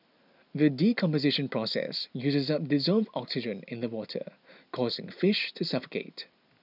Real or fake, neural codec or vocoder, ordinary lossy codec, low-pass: real; none; none; 5.4 kHz